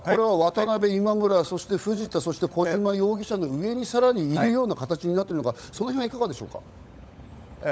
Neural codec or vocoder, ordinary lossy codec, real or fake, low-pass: codec, 16 kHz, 16 kbps, FunCodec, trained on LibriTTS, 50 frames a second; none; fake; none